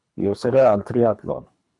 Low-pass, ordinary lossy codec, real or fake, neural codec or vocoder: 10.8 kHz; MP3, 96 kbps; fake; codec, 24 kHz, 3 kbps, HILCodec